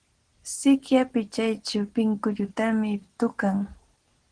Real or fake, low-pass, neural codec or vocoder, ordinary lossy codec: real; 9.9 kHz; none; Opus, 16 kbps